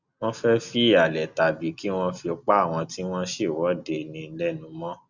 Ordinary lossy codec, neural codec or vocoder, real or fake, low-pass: Opus, 64 kbps; none; real; 7.2 kHz